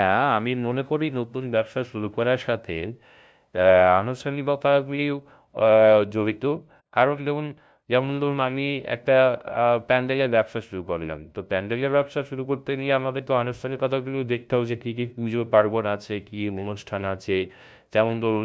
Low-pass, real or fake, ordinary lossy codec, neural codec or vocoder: none; fake; none; codec, 16 kHz, 0.5 kbps, FunCodec, trained on LibriTTS, 25 frames a second